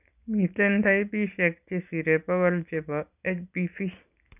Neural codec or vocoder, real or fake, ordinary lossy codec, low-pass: none; real; none; 3.6 kHz